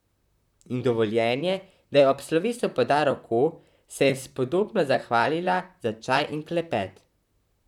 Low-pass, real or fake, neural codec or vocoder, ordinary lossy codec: 19.8 kHz; fake; vocoder, 44.1 kHz, 128 mel bands, Pupu-Vocoder; none